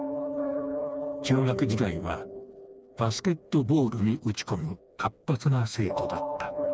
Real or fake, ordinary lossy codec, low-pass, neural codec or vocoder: fake; none; none; codec, 16 kHz, 2 kbps, FreqCodec, smaller model